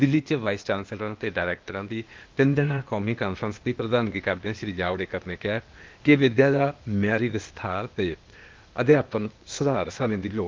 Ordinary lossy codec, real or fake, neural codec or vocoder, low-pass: Opus, 32 kbps; fake; codec, 16 kHz, 0.8 kbps, ZipCodec; 7.2 kHz